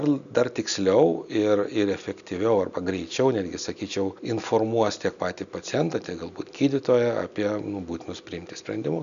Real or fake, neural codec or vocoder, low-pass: real; none; 7.2 kHz